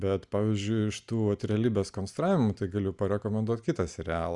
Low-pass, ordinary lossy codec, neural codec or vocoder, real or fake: 10.8 kHz; Opus, 64 kbps; none; real